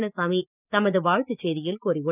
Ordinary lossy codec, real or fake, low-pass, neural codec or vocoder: none; real; 3.6 kHz; none